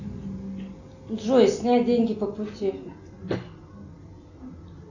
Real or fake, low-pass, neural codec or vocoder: real; 7.2 kHz; none